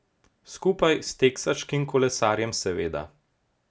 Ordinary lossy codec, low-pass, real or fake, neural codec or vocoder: none; none; real; none